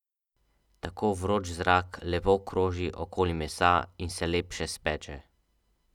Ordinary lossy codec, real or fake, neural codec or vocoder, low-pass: none; real; none; 19.8 kHz